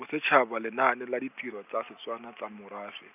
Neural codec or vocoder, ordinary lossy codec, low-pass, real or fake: none; none; 3.6 kHz; real